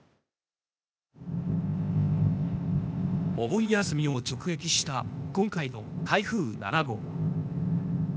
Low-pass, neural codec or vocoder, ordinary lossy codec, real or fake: none; codec, 16 kHz, 0.8 kbps, ZipCodec; none; fake